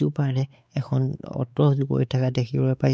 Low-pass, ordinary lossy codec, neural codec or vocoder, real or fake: none; none; codec, 16 kHz, 4 kbps, X-Codec, WavLM features, trained on Multilingual LibriSpeech; fake